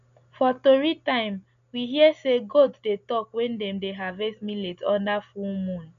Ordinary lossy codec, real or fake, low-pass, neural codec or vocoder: AAC, 96 kbps; real; 7.2 kHz; none